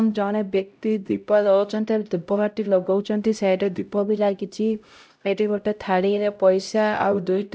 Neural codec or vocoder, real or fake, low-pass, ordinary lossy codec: codec, 16 kHz, 0.5 kbps, X-Codec, HuBERT features, trained on LibriSpeech; fake; none; none